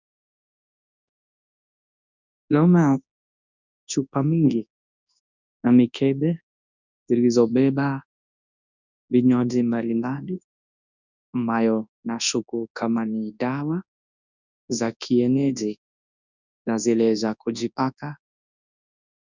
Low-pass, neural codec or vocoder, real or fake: 7.2 kHz; codec, 24 kHz, 0.9 kbps, WavTokenizer, large speech release; fake